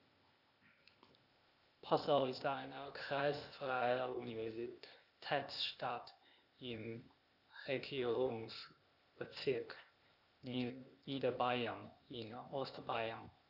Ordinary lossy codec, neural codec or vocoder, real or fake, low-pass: none; codec, 16 kHz, 0.8 kbps, ZipCodec; fake; 5.4 kHz